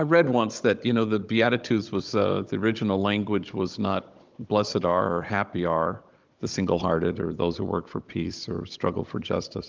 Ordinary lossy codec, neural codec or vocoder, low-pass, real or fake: Opus, 32 kbps; codec, 16 kHz, 16 kbps, FunCodec, trained on Chinese and English, 50 frames a second; 7.2 kHz; fake